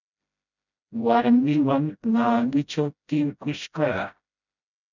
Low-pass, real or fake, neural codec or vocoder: 7.2 kHz; fake; codec, 16 kHz, 0.5 kbps, FreqCodec, smaller model